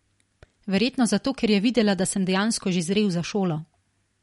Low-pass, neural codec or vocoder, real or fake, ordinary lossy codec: 19.8 kHz; none; real; MP3, 48 kbps